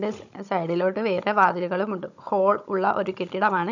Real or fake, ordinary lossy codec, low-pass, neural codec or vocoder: fake; none; 7.2 kHz; codec, 16 kHz, 16 kbps, FunCodec, trained on Chinese and English, 50 frames a second